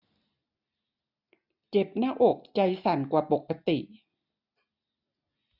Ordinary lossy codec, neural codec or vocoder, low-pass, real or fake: none; none; 5.4 kHz; real